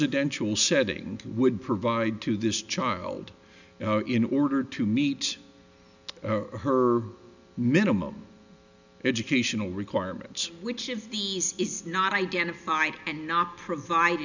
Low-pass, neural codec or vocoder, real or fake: 7.2 kHz; none; real